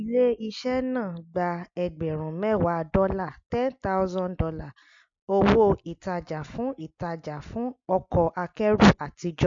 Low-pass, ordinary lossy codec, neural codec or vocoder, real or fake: 7.2 kHz; MP3, 48 kbps; none; real